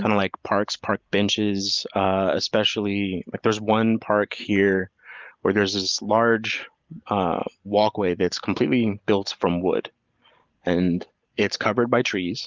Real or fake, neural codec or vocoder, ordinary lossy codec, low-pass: real; none; Opus, 32 kbps; 7.2 kHz